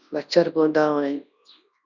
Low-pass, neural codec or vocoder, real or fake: 7.2 kHz; codec, 24 kHz, 0.9 kbps, WavTokenizer, large speech release; fake